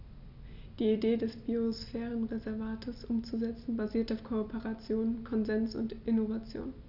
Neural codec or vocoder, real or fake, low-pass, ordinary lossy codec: none; real; 5.4 kHz; none